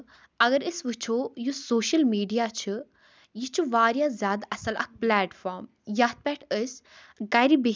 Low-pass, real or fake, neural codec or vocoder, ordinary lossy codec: none; real; none; none